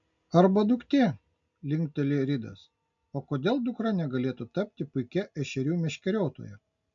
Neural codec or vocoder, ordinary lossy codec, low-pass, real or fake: none; MP3, 64 kbps; 7.2 kHz; real